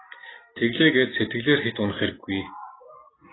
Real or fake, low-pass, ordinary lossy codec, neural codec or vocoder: real; 7.2 kHz; AAC, 16 kbps; none